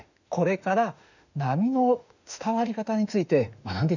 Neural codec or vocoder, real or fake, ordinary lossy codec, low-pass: autoencoder, 48 kHz, 32 numbers a frame, DAC-VAE, trained on Japanese speech; fake; none; 7.2 kHz